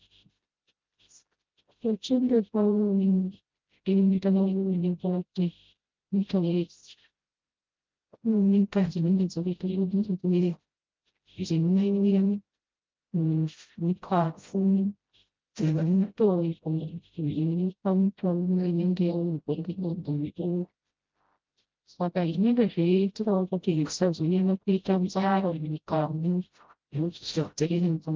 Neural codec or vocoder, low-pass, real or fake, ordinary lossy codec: codec, 16 kHz, 0.5 kbps, FreqCodec, smaller model; 7.2 kHz; fake; Opus, 32 kbps